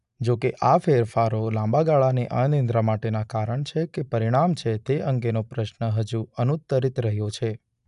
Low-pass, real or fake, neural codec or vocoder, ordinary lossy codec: 10.8 kHz; real; none; none